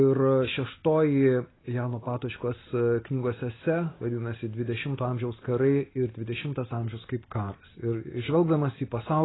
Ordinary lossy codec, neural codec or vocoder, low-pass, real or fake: AAC, 16 kbps; none; 7.2 kHz; real